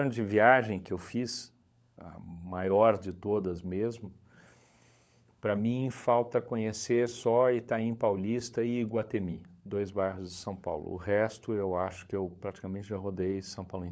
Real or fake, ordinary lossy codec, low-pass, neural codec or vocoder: fake; none; none; codec, 16 kHz, 16 kbps, FunCodec, trained on LibriTTS, 50 frames a second